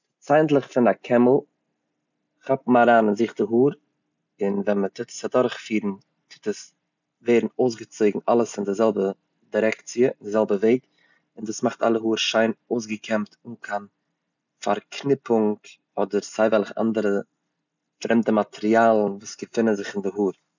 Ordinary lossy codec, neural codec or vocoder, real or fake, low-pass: none; none; real; 7.2 kHz